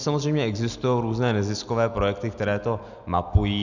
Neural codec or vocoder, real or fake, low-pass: none; real; 7.2 kHz